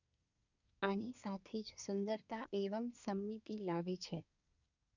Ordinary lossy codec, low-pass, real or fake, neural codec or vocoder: none; 7.2 kHz; fake; codec, 24 kHz, 1 kbps, SNAC